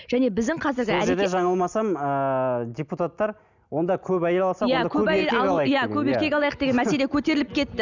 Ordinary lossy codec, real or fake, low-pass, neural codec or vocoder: none; real; 7.2 kHz; none